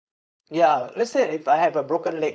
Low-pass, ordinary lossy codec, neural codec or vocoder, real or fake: none; none; codec, 16 kHz, 4.8 kbps, FACodec; fake